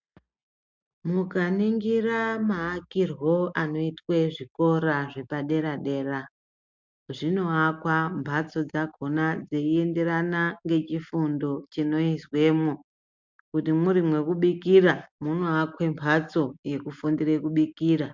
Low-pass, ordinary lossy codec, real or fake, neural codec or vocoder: 7.2 kHz; MP3, 64 kbps; real; none